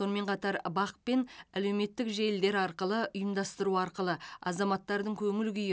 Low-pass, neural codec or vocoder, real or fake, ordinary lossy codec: none; none; real; none